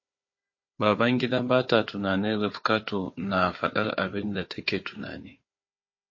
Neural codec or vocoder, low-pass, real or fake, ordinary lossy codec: codec, 16 kHz, 4 kbps, FunCodec, trained on Chinese and English, 50 frames a second; 7.2 kHz; fake; MP3, 32 kbps